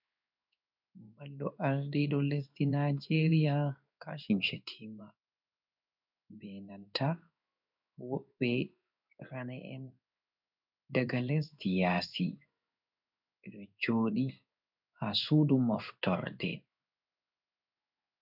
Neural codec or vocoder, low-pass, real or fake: codec, 16 kHz in and 24 kHz out, 1 kbps, XY-Tokenizer; 5.4 kHz; fake